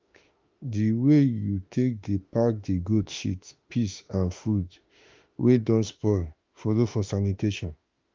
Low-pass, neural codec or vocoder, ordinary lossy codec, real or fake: 7.2 kHz; autoencoder, 48 kHz, 32 numbers a frame, DAC-VAE, trained on Japanese speech; Opus, 24 kbps; fake